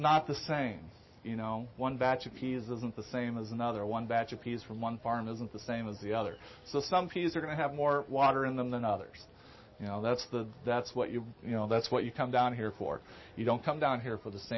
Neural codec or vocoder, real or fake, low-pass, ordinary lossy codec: none; real; 7.2 kHz; MP3, 24 kbps